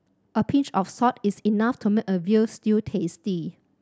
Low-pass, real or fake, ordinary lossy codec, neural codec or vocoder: none; real; none; none